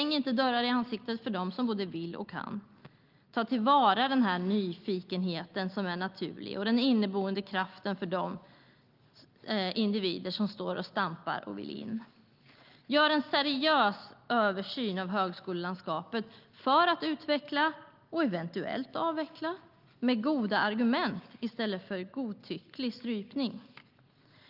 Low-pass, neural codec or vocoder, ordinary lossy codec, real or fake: 5.4 kHz; none; Opus, 32 kbps; real